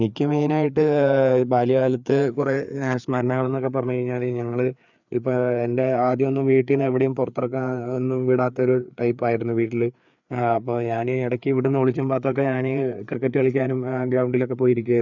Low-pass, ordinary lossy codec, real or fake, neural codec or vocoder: 7.2 kHz; none; fake; codec, 16 kHz, 4 kbps, FreqCodec, larger model